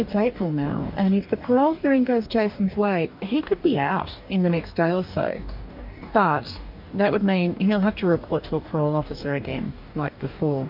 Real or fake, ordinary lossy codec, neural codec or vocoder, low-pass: fake; MP3, 48 kbps; codec, 44.1 kHz, 2.6 kbps, DAC; 5.4 kHz